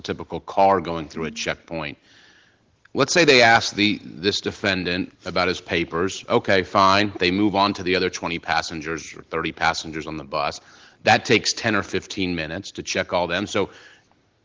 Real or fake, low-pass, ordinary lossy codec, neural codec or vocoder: real; 7.2 kHz; Opus, 16 kbps; none